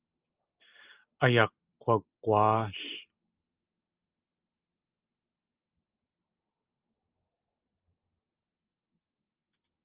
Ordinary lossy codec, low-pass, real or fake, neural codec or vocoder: Opus, 24 kbps; 3.6 kHz; real; none